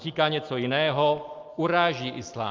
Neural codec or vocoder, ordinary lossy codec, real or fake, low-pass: none; Opus, 32 kbps; real; 7.2 kHz